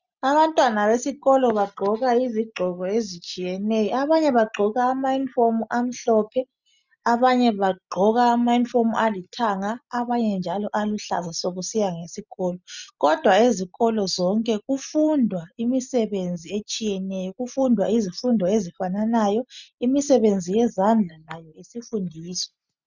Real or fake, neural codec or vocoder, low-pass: real; none; 7.2 kHz